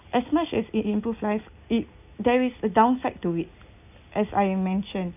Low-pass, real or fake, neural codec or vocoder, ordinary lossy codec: 3.6 kHz; real; none; none